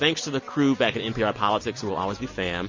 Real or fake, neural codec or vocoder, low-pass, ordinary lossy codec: real; none; 7.2 kHz; MP3, 32 kbps